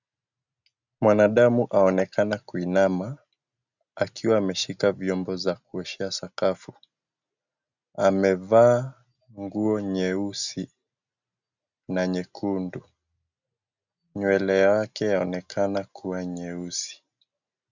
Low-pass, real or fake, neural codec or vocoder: 7.2 kHz; real; none